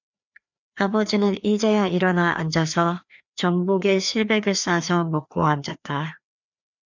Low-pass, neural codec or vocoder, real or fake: 7.2 kHz; codec, 16 kHz, 2 kbps, FreqCodec, larger model; fake